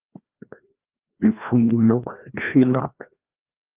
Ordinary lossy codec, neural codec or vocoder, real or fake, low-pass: Opus, 24 kbps; codec, 16 kHz, 1 kbps, FreqCodec, larger model; fake; 3.6 kHz